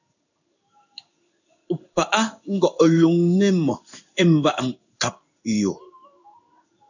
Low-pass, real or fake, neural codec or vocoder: 7.2 kHz; fake; codec, 16 kHz in and 24 kHz out, 1 kbps, XY-Tokenizer